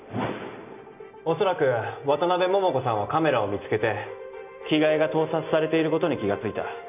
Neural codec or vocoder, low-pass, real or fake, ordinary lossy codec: none; 3.6 kHz; real; none